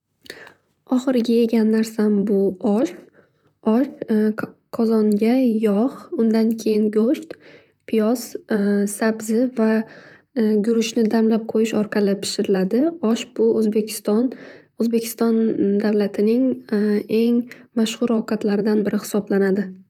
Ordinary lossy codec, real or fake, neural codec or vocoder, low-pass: none; fake; vocoder, 44.1 kHz, 128 mel bands, Pupu-Vocoder; 19.8 kHz